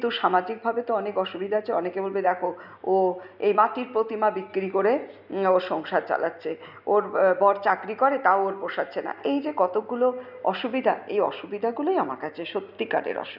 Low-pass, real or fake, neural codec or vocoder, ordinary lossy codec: 5.4 kHz; real; none; none